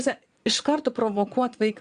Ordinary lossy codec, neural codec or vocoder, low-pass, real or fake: Opus, 64 kbps; vocoder, 22.05 kHz, 80 mel bands, Vocos; 9.9 kHz; fake